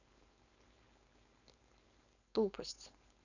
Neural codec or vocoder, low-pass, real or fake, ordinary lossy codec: codec, 16 kHz, 4.8 kbps, FACodec; 7.2 kHz; fake; none